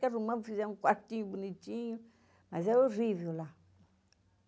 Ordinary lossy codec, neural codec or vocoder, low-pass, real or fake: none; none; none; real